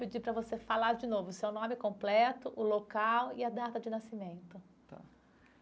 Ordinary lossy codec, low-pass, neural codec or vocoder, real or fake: none; none; none; real